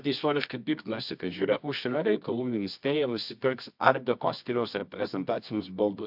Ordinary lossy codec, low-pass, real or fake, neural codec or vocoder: AAC, 48 kbps; 5.4 kHz; fake; codec, 24 kHz, 0.9 kbps, WavTokenizer, medium music audio release